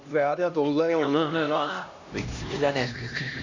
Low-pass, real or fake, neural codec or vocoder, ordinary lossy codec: 7.2 kHz; fake; codec, 16 kHz, 1 kbps, X-Codec, HuBERT features, trained on LibriSpeech; Opus, 64 kbps